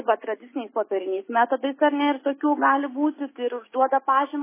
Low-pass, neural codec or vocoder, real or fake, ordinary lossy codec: 3.6 kHz; none; real; MP3, 16 kbps